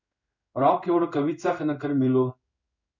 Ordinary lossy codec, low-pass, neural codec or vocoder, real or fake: none; 7.2 kHz; codec, 16 kHz in and 24 kHz out, 1 kbps, XY-Tokenizer; fake